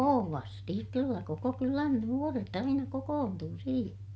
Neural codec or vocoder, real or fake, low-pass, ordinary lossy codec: none; real; none; none